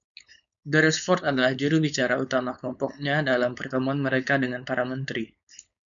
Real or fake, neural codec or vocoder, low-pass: fake; codec, 16 kHz, 4.8 kbps, FACodec; 7.2 kHz